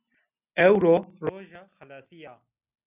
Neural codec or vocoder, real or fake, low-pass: none; real; 3.6 kHz